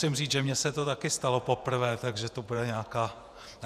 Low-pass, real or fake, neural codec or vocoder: 14.4 kHz; fake; vocoder, 48 kHz, 128 mel bands, Vocos